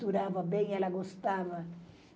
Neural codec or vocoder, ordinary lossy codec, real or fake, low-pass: none; none; real; none